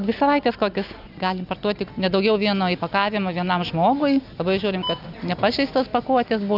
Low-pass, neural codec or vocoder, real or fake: 5.4 kHz; none; real